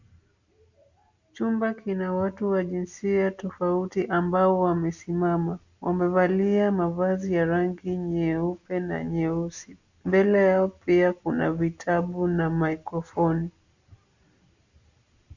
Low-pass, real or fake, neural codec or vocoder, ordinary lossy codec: 7.2 kHz; real; none; Opus, 64 kbps